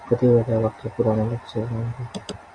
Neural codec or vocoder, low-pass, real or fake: none; 9.9 kHz; real